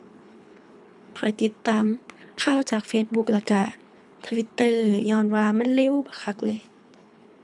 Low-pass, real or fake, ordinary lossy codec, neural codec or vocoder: 10.8 kHz; fake; MP3, 96 kbps; codec, 24 kHz, 3 kbps, HILCodec